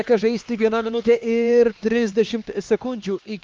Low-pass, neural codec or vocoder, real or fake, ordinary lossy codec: 7.2 kHz; codec, 16 kHz, 2 kbps, X-Codec, HuBERT features, trained on LibriSpeech; fake; Opus, 32 kbps